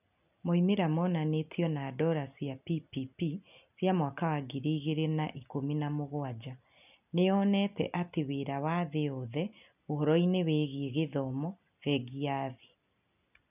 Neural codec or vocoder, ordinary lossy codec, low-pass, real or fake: none; none; 3.6 kHz; real